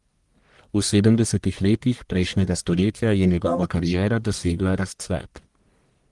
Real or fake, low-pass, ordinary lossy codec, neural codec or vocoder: fake; 10.8 kHz; Opus, 24 kbps; codec, 44.1 kHz, 1.7 kbps, Pupu-Codec